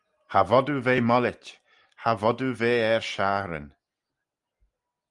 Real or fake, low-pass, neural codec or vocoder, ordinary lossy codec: real; 10.8 kHz; none; Opus, 32 kbps